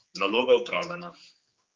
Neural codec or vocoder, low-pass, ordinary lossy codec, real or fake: codec, 16 kHz, 4 kbps, X-Codec, HuBERT features, trained on general audio; 7.2 kHz; Opus, 24 kbps; fake